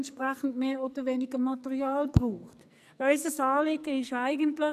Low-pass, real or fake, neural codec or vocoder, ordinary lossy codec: 14.4 kHz; fake; codec, 44.1 kHz, 2.6 kbps, SNAC; none